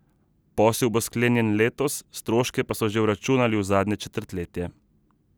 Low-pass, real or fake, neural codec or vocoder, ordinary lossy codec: none; real; none; none